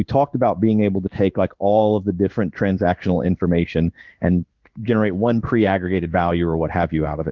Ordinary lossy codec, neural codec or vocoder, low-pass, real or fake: Opus, 32 kbps; codec, 16 kHz in and 24 kHz out, 1 kbps, XY-Tokenizer; 7.2 kHz; fake